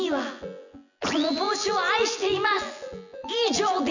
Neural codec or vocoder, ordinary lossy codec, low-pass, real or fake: vocoder, 24 kHz, 100 mel bands, Vocos; none; 7.2 kHz; fake